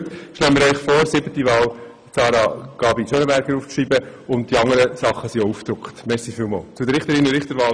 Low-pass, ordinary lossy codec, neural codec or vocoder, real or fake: 9.9 kHz; none; none; real